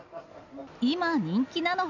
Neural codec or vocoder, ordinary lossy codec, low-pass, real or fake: none; none; 7.2 kHz; real